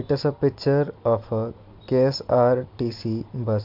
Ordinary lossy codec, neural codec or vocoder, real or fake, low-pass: MP3, 48 kbps; none; real; 5.4 kHz